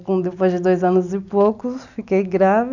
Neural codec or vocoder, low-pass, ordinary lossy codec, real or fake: none; 7.2 kHz; none; real